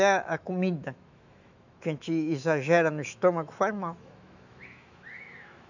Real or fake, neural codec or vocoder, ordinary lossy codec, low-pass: fake; autoencoder, 48 kHz, 128 numbers a frame, DAC-VAE, trained on Japanese speech; none; 7.2 kHz